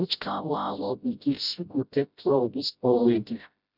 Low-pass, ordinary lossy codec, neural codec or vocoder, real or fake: 5.4 kHz; none; codec, 16 kHz, 0.5 kbps, FreqCodec, smaller model; fake